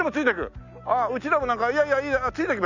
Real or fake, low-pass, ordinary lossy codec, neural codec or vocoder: real; 7.2 kHz; none; none